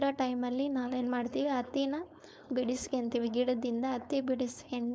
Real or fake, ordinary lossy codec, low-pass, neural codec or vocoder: fake; none; none; codec, 16 kHz, 4.8 kbps, FACodec